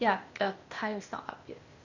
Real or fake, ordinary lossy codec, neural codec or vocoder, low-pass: fake; Opus, 64 kbps; codec, 16 kHz, 0.8 kbps, ZipCodec; 7.2 kHz